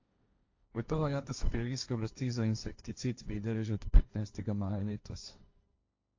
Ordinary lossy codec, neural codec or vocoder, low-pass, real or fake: AAC, 48 kbps; codec, 16 kHz, 1.1 kbps, Voila-Tokenizer; 7.2 kHz; fake